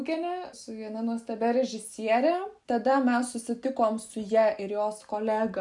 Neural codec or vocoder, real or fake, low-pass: none; real; 10.8 kHz